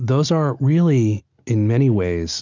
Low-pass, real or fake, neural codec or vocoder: 7.2 kHz; real; none